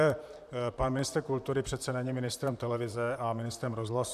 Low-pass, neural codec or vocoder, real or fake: 14.4 kHz; vocoder, 44.1 kHz, 128 mel bands, Pupu-Vocoder; fake